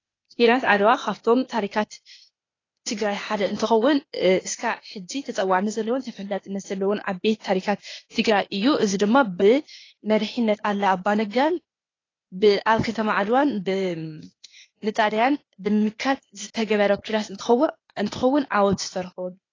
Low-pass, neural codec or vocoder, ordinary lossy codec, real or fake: 7.2 kHz; codec, 16 kHz, 0.8 kbps, ZipCodec; AAC, 32 kbps; fake